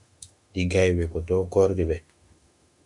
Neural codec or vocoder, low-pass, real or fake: autoencoder, 48 kHz, 32 numbers a frame, DAC-VAE, trained on Japanese speech; 10.8 kHz; fake